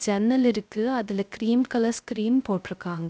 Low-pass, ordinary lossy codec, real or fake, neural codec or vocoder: none; none; fake; codec, 16 kHz, 0.3 kbps, FocalCodec